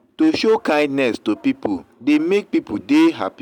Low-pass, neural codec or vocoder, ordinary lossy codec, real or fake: 19.8 kHz; none; none; real